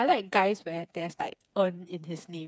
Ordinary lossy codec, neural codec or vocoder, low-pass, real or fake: none; codec, 16 kHz, 4 kbps, FreqCodec, smaller model; none; fake